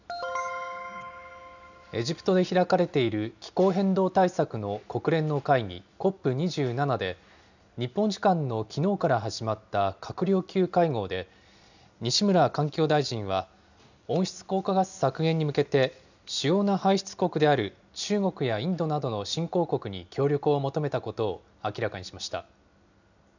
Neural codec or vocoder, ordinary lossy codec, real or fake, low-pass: none; none; real; 7.2 kHz